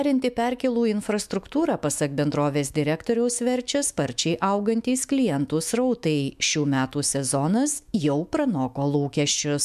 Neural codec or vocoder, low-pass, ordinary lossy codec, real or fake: autoencoder, 48 kHz, 128 numbers a frame, DAC-VAE, trained on Japanese speech; 14.4 kHz; MP3, 96 kbps; fake